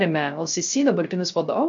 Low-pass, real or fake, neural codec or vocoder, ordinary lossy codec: 7.2 kHz; fake; codec, 16 kHz, 0.3 kbps, FocalCodec; MP3, 48 kbps